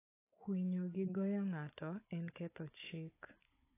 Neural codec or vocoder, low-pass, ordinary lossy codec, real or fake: codec, 16 kHz, 16 kbps, FunCodec, trained on Chinese and English, 50 frames a second; 3.6 kHz; AAC, 24 kbps; fake